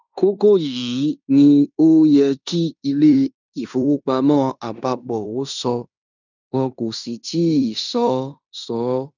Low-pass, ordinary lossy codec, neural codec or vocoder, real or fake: 7.2 kHz; none; codec, 16 kHz in and 24 kHz out, 0.9 kbps, LongCat-Audio-Codec, fine tuned four codebook decoder; fake